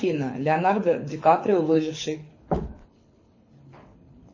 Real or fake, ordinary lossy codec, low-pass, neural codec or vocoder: fake; MP3, 32 kbps; 7.2 kHz; codec, 24 kHz, 6 kbps, HILCodec